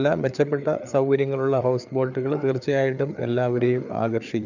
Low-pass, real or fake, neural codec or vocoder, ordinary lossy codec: 7.2 kHz; fake; codec, 16 kHz, 4 kbps, FreqCodec, larger model; none